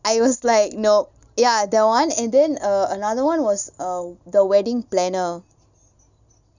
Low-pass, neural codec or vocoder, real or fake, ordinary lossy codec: 7.2 kHz; none; real; none